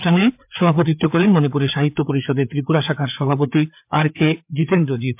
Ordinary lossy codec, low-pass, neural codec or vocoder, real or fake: MP3, 32 kbps; 3.6 kHz; codec, 16 kHz, 4 kbps, FreqCodec, larger model; fake